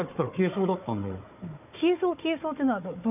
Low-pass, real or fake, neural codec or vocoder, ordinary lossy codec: 3.6 kHz; fake; codec, 16 kHz, 4 kbps, FunCodec, trained on Chinese and English, 50 frames a second; none